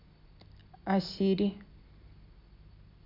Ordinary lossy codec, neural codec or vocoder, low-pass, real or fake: MP3, 48 kbps; none; 5.4 kHz; real